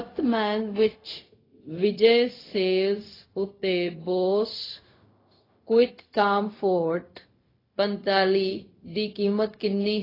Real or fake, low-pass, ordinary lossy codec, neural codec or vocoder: fake; 5.4 kHz; AAC, 24 kbps; codec, 16 kHz, 0.4 kbps, LongCat-Audio-Codec